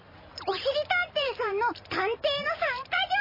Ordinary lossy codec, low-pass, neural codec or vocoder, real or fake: MP3, 24 kbps; 5.4 kHz; vocoder, 44.1 kHz, 128 mel bands every 512 samples, BigVGAN v2; fake